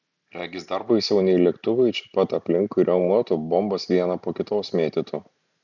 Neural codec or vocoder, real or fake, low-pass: none; real; 7.2 kHz